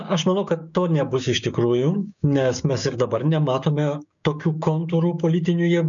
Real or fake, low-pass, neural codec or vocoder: fake; 7.2 kHz; codec, 16 kHz, 6 kbps, DAC